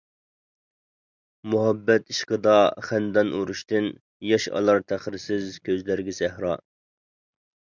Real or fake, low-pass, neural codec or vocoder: real; 7.2 kHz; none